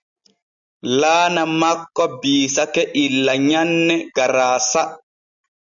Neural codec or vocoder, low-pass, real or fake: none; 7.2 kHz; real